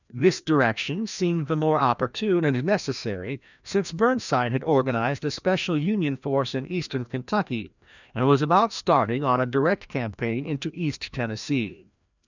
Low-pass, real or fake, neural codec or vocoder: 7.2 kHz; fake; codec, 16 kHz, 1 kbps, FreqCodec, larger model